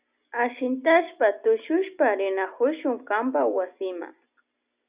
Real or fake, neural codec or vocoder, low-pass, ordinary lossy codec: real; none; 3.6 kHz; Opus, 64 kbps